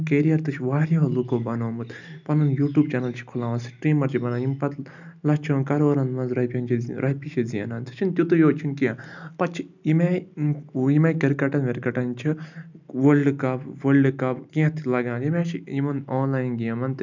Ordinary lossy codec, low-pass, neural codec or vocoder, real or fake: none; 7.2 kHz; none; real